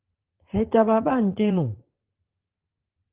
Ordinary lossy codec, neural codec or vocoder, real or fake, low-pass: Opus, 16 kbps; vocoder, 44.1 kHz, 80 mel bands, Vocos; fake; 3.6 kHz